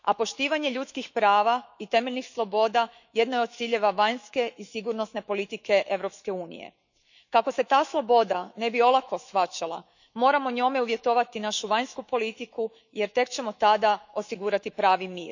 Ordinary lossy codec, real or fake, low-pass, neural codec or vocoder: none; fake; 7.2 kHz; autoencoder, 48 kHz, 128 numbers a frame, DAC-VAE, trained on Japanese speech